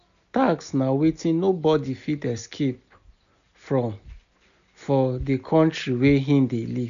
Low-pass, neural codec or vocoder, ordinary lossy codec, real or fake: 7.2 kHz; none; none; real